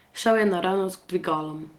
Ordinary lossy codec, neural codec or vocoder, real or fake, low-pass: Opus, 16 kbps; none; real; 19.8 kHz